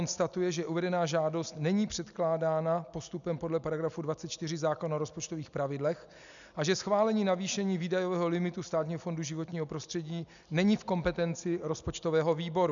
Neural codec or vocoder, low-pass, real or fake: none; 7.2 kHz; real